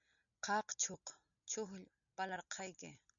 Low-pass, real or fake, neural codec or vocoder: 7.2 kHz; real; none